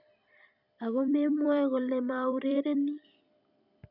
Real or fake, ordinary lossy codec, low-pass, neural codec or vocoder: fake; none; 5.4 kHz; vocoder, 44.1 kHz, 128 mel bands every 256 samples, BigVGAN v2